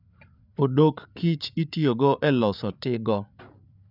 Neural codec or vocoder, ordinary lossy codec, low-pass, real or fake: codec, 16 kHz, 8 kbps, FreqCodec, larger model; none; 5.4 kHz; fake